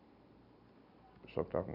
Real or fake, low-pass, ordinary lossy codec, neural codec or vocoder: real; 5.4 kHz; none; none